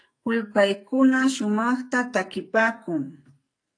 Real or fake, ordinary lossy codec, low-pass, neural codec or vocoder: fake; AAC, 48 kbps; 9.9 kHz; codec, 44.1 kHz, 2.6 kbps, SNAC